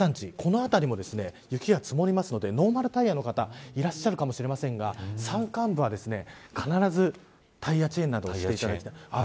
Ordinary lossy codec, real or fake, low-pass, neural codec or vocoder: none; real; none; none